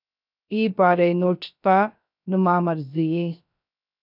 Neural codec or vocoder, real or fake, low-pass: codec, 16 kHz, 0.3 kbps, FocalCodec; fake; 5.4 kHz